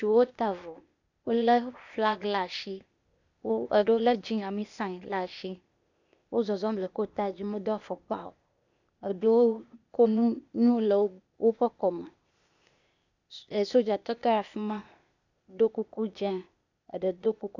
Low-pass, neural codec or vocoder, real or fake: 7.2 kHz; codec, 16 kHz, 0.8 kbps, ZipCodec; fake